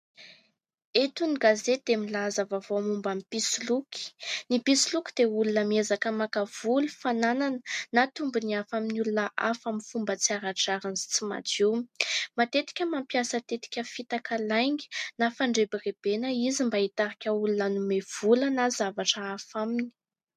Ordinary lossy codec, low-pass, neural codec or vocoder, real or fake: MP3, 64 kbps; 14.4 kHz; none; real